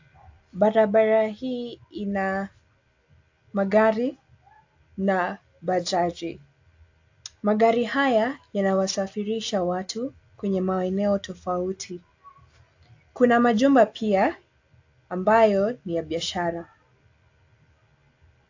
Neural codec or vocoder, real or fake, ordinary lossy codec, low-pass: none; real; AAC, 48 kbps; 7.2 kHz